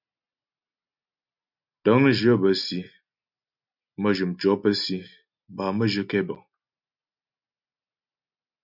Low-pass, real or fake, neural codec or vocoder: 5.4 kHz; real; none